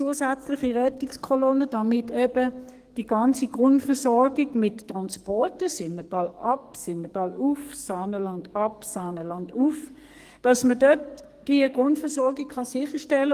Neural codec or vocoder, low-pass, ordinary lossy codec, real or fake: codec, 44.1 kHz, 2.6 kbps, SNAC; 14.4 kHz; Opus, 24 kbps; fake